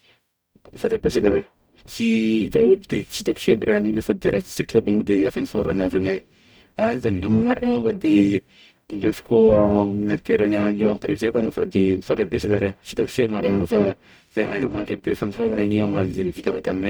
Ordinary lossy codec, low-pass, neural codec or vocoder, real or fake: none; none; codec, 44.1 kHz, 0.9 kbps, DAC; fake